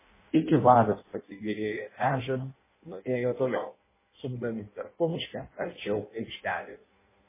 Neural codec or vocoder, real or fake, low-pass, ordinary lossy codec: codec, 16 kHz in and 24 kHz out, 0.6 kbps, FireRedTTS-2 codec; fake; 3.6 kHz; MP3, 16 kbps